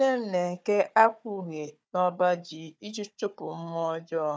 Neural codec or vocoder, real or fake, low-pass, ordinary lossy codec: codec, 16 kHz, 4 kbps, FunCodec, trained on Chinese and English, 50 frames a second; fake; none; none